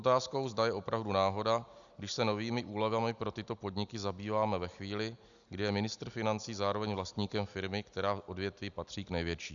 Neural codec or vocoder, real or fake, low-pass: none; real; 7.2 kHz